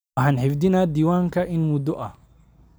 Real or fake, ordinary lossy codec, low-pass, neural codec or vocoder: real; none; none; none